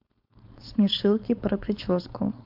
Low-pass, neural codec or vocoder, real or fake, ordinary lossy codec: 5.4 kHz; codec, 16 kHz, 4.8 kbps, FACodec; fake; MP3, 48 kbps